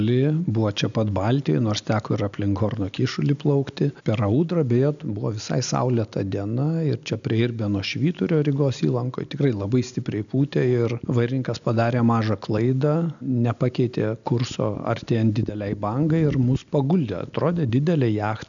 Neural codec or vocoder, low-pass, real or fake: none; 7.2 kHz; real